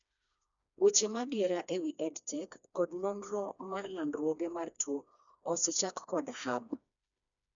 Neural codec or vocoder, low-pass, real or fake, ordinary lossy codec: codec, 16 kHz, 2 kbps, FreqCodec, smaller model; 7.2 kHz; fake; none